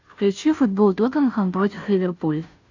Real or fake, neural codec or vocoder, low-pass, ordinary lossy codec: fake; codec, 16 kHz, 0.5 kbps, FunCodec, trained on Chinese and English, 25 frames a second; 7.2 kHz; AAC, 48 kbps